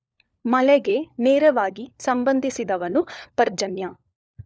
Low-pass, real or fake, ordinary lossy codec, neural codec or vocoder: none; fake; none; codec, 16 kHz, 4 kbps, FunCodec, trained on LibriTTS, 50 frames a second